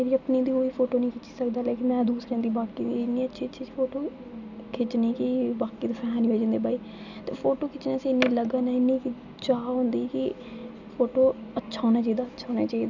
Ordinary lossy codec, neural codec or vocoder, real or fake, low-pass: none; none; real; 7.2 kHz